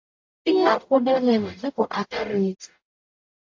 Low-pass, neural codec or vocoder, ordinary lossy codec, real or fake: 7.2 kHz; codec, 44.1 kHz, 0.9 kbps, DAC; AAC, 48 kbps; fake